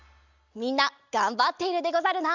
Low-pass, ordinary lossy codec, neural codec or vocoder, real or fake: 7.2 kHz; none; none; real